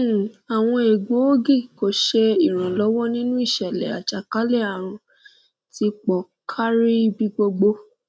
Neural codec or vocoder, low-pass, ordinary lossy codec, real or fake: none; none; none; real